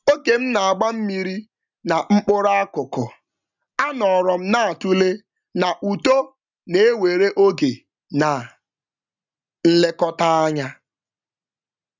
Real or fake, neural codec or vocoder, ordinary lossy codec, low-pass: real; none; none; 7.2 kHz